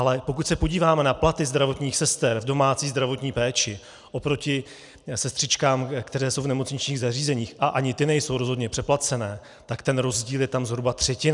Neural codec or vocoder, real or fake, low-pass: none; real; 10.8 kHz